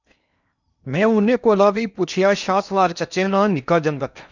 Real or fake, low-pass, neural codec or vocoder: fake; 7.2 kHz; codec, 16 kHz in and 24 kHz out, 0.8 kbps, FocalCodec, streaming, 65536 codes